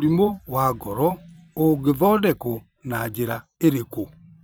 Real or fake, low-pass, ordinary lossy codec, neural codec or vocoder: fake; none; none; vocoder, 44.1 kHz, 128 mel bands every 512 samples, BigVGAN v2